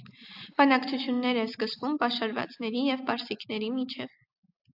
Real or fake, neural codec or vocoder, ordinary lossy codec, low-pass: real; none; AAC, 48 kbps; 5.4 kHz